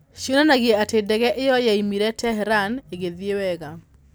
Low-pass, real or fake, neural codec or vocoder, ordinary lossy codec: none; real; none; none